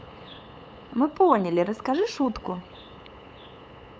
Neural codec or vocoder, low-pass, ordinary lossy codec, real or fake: codec, 16 kHz, 8 kbps, FunCodec, trained on LibriTTS, 25 frames a second; none; none; fake